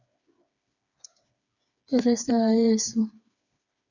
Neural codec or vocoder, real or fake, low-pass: codec, 16 kHz, 4 kbps, FreqCodec, smaller model; fake; 7.2 kHz